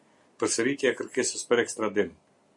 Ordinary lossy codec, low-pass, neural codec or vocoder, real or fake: MP3, 48 kbps; 10.8 kHz; none; real